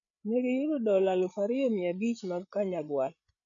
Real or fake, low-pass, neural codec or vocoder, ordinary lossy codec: fake; 7.2 kHz; codec, 16 kHz, 8 kbps, FreqCodec, larger model; none